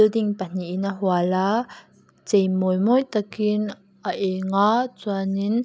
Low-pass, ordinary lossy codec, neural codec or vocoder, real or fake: none; none; none; real